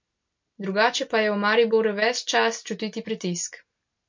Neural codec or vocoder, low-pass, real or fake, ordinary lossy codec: none; 7.2 kHz; real; MP3, 48 kbps